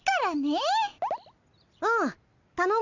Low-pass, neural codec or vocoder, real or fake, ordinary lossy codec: 7.2 kHz; none; real; none